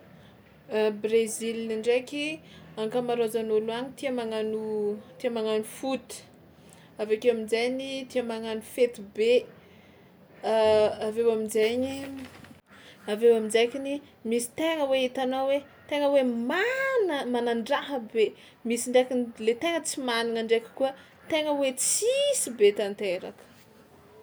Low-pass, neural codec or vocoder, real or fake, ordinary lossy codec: none; none; real; none